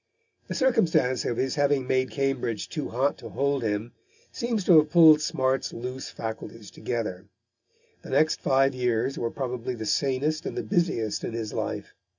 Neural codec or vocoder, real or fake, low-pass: none; real; 7.2 kHz